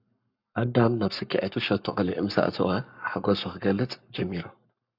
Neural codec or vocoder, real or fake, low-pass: codec, 44.1 kHz, 7.8 kbps, Pupu-Codec; fake; 5.4 kHz